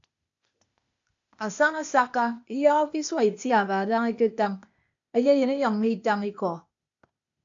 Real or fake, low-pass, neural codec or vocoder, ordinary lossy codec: fake; 7.2 kHz; codec, 16 kHz, 0.8 kbps, ZipCodec; AAC, 64 kbps